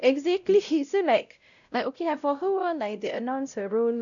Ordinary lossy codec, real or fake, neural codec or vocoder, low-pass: none; fake; codec, 16 kHz, 0.5 kbps, X-Codec, WavLM features, trained on Multilingual LibriSpeech; 7.2 kHz